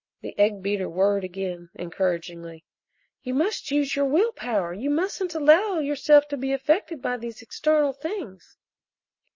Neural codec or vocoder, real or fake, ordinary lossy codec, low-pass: none; real; MP3, 32 kbps; 7.2 kHz